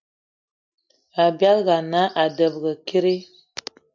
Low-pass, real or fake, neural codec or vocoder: 7.2 kHz; real; none